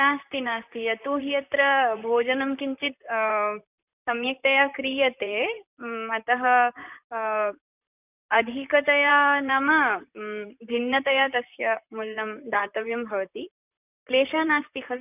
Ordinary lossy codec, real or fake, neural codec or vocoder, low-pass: none; fake; vocoder, 44.1 kHz, 128 mel bands, Pupu-Vocoder; 3.6 kHz